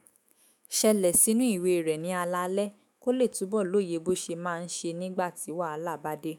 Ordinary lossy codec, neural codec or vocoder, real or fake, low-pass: none; autoencoder, 48 kHz, 128 numbers a frame, DAC-VAE, trained on Japanese speech; fake; none